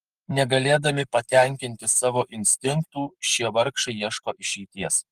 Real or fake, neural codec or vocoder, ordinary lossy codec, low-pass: fake; codec, 44.1 kHz, 7.8 kbps, Pupu-Codec; Opus, 32 kbps; 14.4 kHz